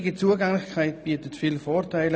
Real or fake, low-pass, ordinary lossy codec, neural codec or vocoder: real; none; none; none